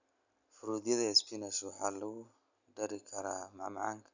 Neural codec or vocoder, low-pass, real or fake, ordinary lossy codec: none; 7.2 kHz; real; none